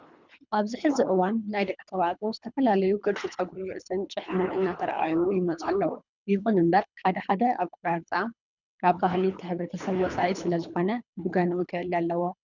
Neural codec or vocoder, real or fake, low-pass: codec, 24 kHz, 3 kbps, HILCodec; fake; 7.2 kHz